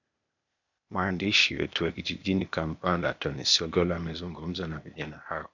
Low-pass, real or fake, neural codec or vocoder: 7.2 kHz; fake; codec, 16 kHz, 0.8 kbps, ZipCodec